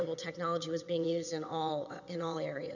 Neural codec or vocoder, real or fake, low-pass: vocoder, 22.05 kHz, 80 mel bands, WaveNeXt; fake; 7.2 kHz